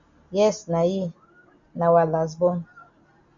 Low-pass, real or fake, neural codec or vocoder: 7.2 kHz; real; none